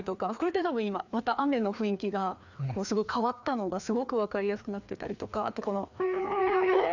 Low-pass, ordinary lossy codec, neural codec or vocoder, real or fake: 7.2 kHz; none; codec, 16 kHz, 2 kbps, FreqCodec, larger model; fake